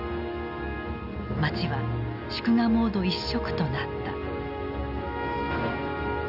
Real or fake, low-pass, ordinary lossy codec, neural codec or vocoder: real; 5.4 kHz; none; none